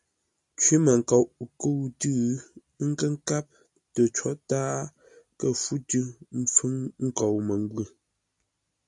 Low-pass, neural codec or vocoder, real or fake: 10.8 kHz; none; real